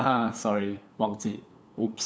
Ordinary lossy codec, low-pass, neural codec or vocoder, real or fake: none; none; codec, 16 kHz, 8 kbps, FunCodec, trained on LibriTTS, 25 frames a second; fake